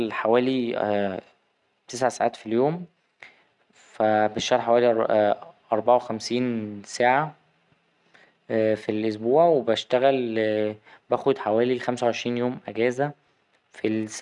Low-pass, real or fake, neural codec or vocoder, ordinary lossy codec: 10.8 kHz; real; none; none